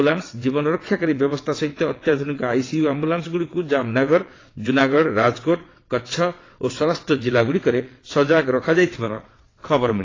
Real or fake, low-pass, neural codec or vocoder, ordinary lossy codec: fake; 7.2 kHz; vocoder, 22.05 kHz, 80 mel bands, WaveNeXt; AAC, 32 kbps